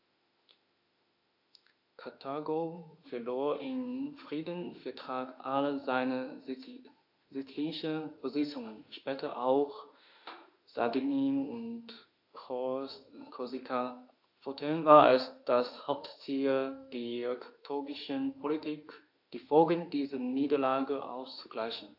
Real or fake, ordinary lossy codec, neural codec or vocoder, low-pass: fake; AAC, 48 kbps; autoencoder, 48 kHz, 32 numbers a frame, DAC-VAE, trained on Japanese speech; 5.4 kHz